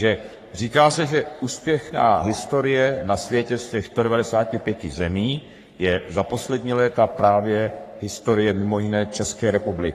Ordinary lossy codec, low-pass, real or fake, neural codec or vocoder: AAC, 48 kbps; 14.4 kHz; fake; codec, 44.1 kHz, 3.4 kbps, Pupu-Codec